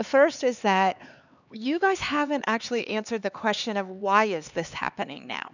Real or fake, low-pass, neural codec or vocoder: fake; 7.2 kHz; codec, 16 kHz, 4 kbps, X-Codec, HuBERT features, trained on LibriSpeech